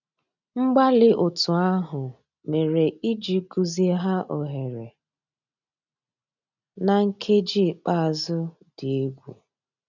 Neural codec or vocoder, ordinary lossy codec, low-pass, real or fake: none; none; 7.2 kHz; real